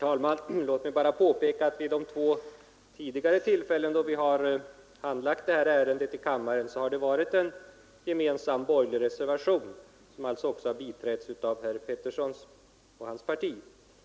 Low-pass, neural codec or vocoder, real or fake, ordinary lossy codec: none; none; real; none